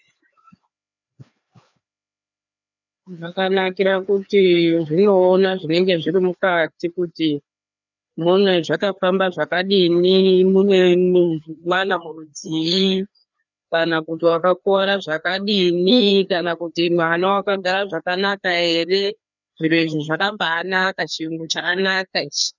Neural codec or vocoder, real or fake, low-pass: codec, 16 kHz, 2 kbps, FreqCodec, larger model; fake; 7.2 kHz